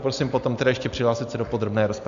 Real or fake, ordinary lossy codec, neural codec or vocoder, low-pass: real; AAC, 64 kbps; none; 7.2 kHz